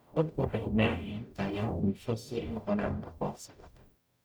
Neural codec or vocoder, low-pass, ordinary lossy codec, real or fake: codec, 44.1 kHz, 0.9 kbps, DAC; none; none; fake